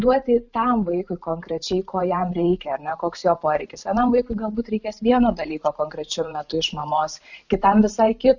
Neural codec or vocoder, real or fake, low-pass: vocoder, 44.1 kHz, 128 mel bands every 512 samples, BigVGAN v2; fake; 7.2 kHz